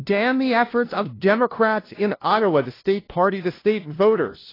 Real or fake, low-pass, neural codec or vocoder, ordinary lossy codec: fake; 5.4 kHz; codec, 16 kHz, 0.5 kbps, FunCodec, trained on LibriTTS, 25 frames a second; AAC, 24 kbps